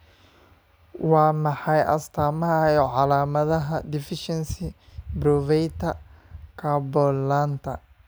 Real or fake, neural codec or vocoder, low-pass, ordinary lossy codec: real; none; none; none